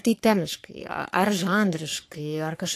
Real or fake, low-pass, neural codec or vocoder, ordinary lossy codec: fake; 14.4 kHz; codec, 44.1 kHz, 3.4 kbps, Pupu-Codec; AAC, 64 kbps